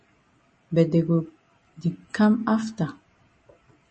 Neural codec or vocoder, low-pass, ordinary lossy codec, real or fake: none; 10.8 kHz; MP3, 32 kbps; real